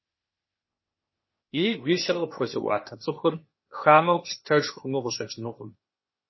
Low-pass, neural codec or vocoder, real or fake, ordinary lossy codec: 7.2 kHz; codec, 16 kHz, 0.8 kbps, ZipCodec; fake; MP3, 24 kbps